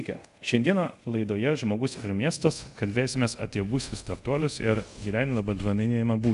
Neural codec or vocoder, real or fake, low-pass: codec, 24 kHz, 0.5 kbps, DualCodec; fake; 10.8 kHz